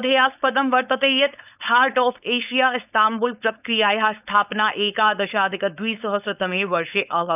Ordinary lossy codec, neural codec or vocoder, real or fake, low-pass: none; codec, 16 kHz, 4.8 kbps, FACodec; fake; 3.6 kHz